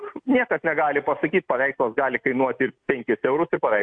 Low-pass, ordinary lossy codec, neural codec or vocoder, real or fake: 9.9 kHz; AAC, 64 kbps; none; real